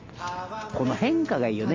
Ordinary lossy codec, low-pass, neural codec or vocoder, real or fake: Opus, 32 kbps; 7.2 kHz; none; real